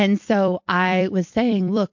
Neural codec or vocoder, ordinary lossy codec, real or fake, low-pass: vocoder, 44.1 kHz, 128 mel bands every 256 samples, BigVGAN v2; MP3, 48 kbps; fake; 7.2 kHz